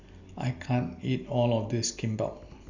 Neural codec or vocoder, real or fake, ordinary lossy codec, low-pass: none; real; Opus, 64 kbps; 7.2 kHz